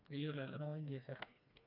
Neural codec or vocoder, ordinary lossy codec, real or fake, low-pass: codec, 16 kHz, 2 kbps, FreqCodec, smaller model; none; fake; 5.4 kHz